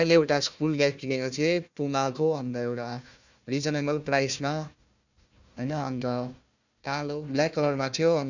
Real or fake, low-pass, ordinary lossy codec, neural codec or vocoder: fake; 7.2 kHz; none; codec, 16 kHz, 1 kbps, FunCodec, trained on Chinese and English, 50 frames a second